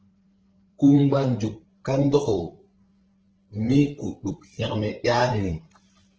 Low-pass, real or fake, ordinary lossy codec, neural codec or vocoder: 7.2 kHz; fake; Opus, 16 kbps; codec, 16 kHz, 8 kbps, FreqCodec, larger model